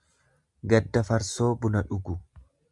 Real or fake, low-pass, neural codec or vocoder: real; 10.8 kHz; none